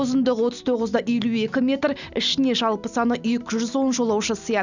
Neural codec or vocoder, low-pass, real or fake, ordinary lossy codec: none; 7.2 kHz; real; none